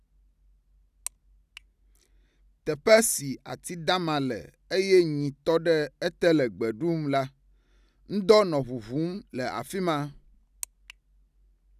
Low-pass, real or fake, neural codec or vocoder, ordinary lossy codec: 14.4 kHz; real; none; none